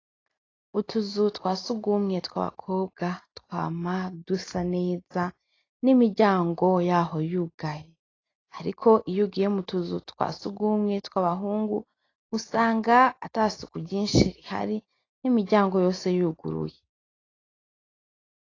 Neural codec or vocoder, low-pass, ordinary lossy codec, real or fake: none; 7.2 kHz; AAC, 32 kbps; real